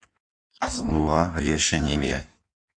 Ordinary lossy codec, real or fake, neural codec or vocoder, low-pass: Opus, 64 kbps; fake; codec, 16 kHz in and 24 kHz out, 1.1 kbps, FireRedTTS-2 codec; 9.9 kHz